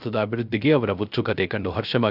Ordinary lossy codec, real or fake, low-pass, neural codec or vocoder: none; fake; 5.4 kHz; codec, 16 kHz, 0.3 kbps, FocalCodec